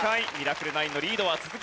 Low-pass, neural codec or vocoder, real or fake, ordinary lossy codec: none; none; real; none